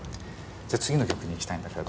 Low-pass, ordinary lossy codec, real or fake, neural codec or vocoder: none; none; real; none